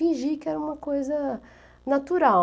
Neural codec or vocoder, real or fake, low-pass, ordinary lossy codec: none; real; none; none